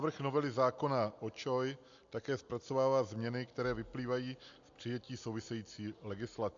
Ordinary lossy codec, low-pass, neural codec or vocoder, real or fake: AAC, 64 kbps; 7.2 kHz; none; real